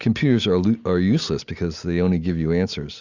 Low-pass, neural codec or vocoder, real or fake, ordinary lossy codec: 7.2 kHz; none; real; Opus, 64 kbps